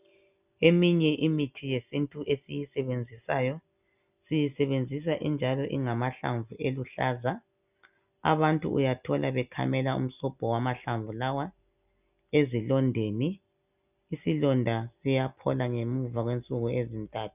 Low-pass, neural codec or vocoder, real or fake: 3.6 kHz; none; real